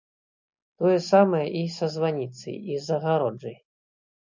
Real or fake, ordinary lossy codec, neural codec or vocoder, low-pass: real; MP3, 48 kbps; none; 7.2 kHz